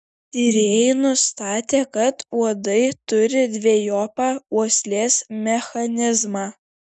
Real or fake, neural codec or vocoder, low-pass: real; none; 10.8 kHz